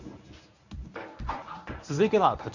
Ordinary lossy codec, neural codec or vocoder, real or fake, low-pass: none; codec, 24 kHz, 0.9 kbps, WavTokenizer, medium speech release version 1; fake; 7.2 kHz